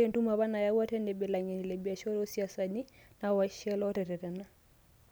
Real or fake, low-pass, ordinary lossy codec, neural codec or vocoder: real; none; none; none